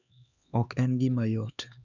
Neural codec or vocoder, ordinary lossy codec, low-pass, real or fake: codec, 16 kHz, 2 kbps, X-Codec, HuBERT features, trained on LibriSpeech; none; 7.2 kHz; fake